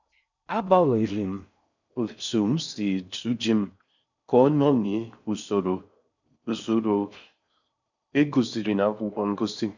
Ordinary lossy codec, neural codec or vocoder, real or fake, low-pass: none; codec, 16 kHz in and 24 kHz out, 0.6 kbps, FocalCodec, streaming, 4096 codes; fake; 7.2 kHz